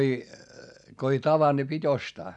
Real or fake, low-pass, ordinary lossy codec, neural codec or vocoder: real; 10.8 kHz; none; none